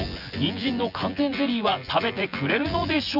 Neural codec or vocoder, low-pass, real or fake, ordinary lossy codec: vocoder, 24 kHz, 100 mel bands, Vocos; 5.4 kHz; fake; none